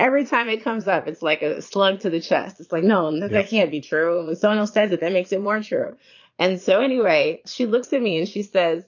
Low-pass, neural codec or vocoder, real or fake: 7.2 kHz; vocoder, 22.05 kHz, 80 mel bands, Vocos; fake